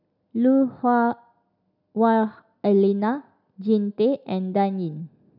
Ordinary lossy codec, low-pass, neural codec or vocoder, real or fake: none; 5.4 kHz; none; real